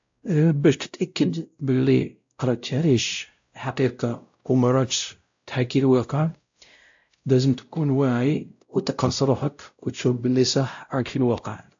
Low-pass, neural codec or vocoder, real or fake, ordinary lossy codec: 7.2 kHz; codec, 16 kHz, 0.5 kbps, X-Codec, WavLM features, trained on Multilingual LibriSpeech; fake; AAC, 64 kbps